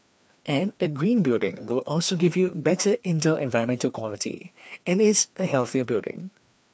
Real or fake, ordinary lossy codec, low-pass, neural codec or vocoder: fake; none; none; codec, 16 kHz, 2 kbps, FreqCodec, larger model